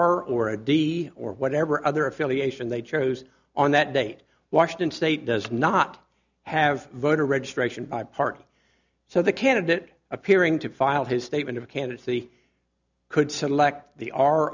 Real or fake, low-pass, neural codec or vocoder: real; 7.2 kHz; none